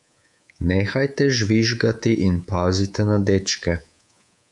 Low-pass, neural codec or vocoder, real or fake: 10.8 kHz; codec, 24 kHz, 3.1 kbps, DualCodec; fake